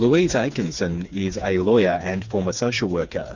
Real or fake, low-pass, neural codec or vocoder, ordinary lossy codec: fake; 7.2 kHz; codec, 16 kHz, 4 kbps, FreqCodec, smaller model; Opus, 64 kbps